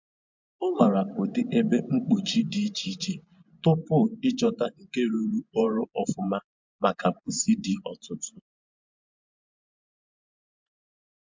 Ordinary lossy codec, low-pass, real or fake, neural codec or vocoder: MP3, 64 kbps; 7.2 kHz; fake; vocoder, 24 kHz, 100 mel bands, Vocos